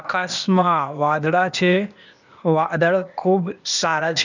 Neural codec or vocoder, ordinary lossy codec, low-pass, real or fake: codec, 16 kHz, 0.8 kbps, ZipCodec; none; 7.2 kHz; fake